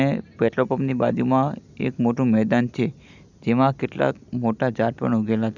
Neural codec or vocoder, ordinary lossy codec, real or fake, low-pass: none; none; real; 7.2 kHz